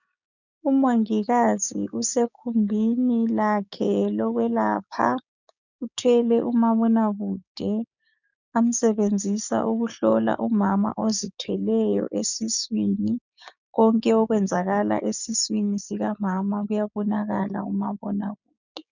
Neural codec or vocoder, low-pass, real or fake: codec, 16 kHz, 6 kbps, DAC; 7.2 kHz; fake